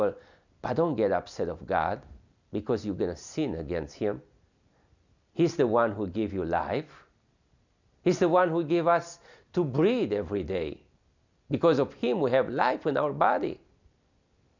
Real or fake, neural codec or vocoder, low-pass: real; none; 7.2 kHz